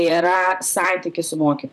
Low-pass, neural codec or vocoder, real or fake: 14.4 kHz; vocoder, 44.1 kHz, 128 mel bands, Pupu-Vocoder; fake